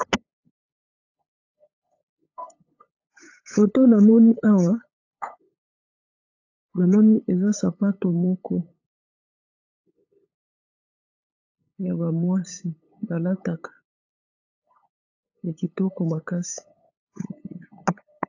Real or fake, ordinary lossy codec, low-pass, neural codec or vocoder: fake; AAC, 48 kbps; 7.2 kHz; codec, 16 kHz, 8 kbps, FunCodec, trained on LibriTTS, 25 frames a second